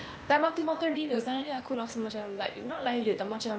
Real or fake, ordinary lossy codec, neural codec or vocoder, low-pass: fake; none; codec, 16 kHz, 0.8 kbps, ZipCodec; none